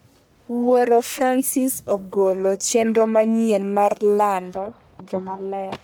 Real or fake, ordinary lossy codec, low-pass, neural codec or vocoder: fake; none; none; codec, 44.1 kHz, 1.7 kbps, Pupu-Codec